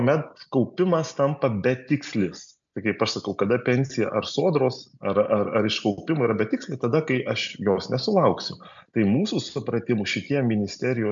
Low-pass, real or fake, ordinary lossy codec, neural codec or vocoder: 7.2 kHz; real; MP3, 64 kbps; none